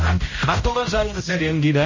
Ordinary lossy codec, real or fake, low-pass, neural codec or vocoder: MP3, 32 kbps; fake; 7.2 kHz; codec, 16 kHz, 0.5 kbps, X-Codec, HuBERT features, trained on general audio